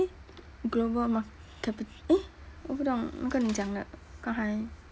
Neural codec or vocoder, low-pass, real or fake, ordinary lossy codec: none; none; real; none